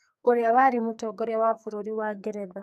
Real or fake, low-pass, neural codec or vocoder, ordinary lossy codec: fake; 14.4 kHz; codec, 44.1 kHz, 2.6 kbps, SNAC; none